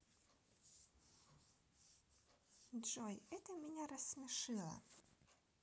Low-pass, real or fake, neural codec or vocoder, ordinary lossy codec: none; real; none; none